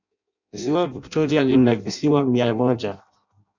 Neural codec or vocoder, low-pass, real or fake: codec, 16 kHz in and 24 kHz out, 0.6 kbps, FireRedTTS-2 codec; 7.2 kHz; fake